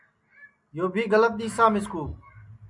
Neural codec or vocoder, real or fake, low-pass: none; real; 10.8 kHz